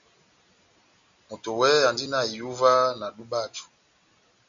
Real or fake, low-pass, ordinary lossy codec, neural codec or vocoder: real; 7.2 kHz; MP3, 64 kbps; none